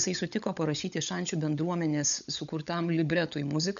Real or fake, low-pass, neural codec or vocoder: fake; 7.2 kHz; codec, 16 kHz, 16 kbps, FunCodec, trained on LibriTTS, 50 frames a second